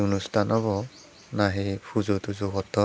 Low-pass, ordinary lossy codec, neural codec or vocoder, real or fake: none; none; none; real